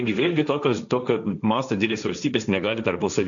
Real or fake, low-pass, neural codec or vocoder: fake; 7.2 kHz; codec, 16 kHz, 1.1 kbps, Voila-Tokenizer